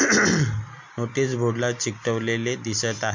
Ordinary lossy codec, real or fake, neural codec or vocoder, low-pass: MP3, 48 kbps; real; none; 7.2 kHz